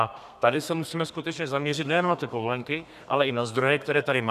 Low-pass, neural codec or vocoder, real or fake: 14.4 kHz; codec, 32 kHz, 1.9 kbps, SNAC; fake